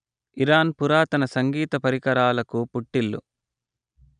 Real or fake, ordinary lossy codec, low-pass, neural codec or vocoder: real; none; 9.9 kHz; none